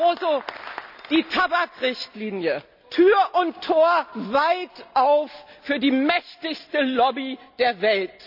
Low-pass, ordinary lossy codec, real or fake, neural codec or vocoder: 5.4 kHz; none; real; none